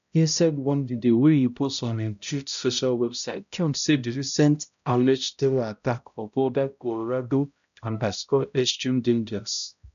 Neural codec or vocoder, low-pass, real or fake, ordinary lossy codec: codec, 16 kHz, 0.5 kbps, X-Codec, HuBERT features, trained on balanced general audio; 7.2 kHz; fake; none